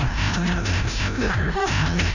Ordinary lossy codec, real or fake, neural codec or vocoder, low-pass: none; fake; codec, 16 kHz, 0.5 kbps, FreqCodec, larger model; 7.2 kHz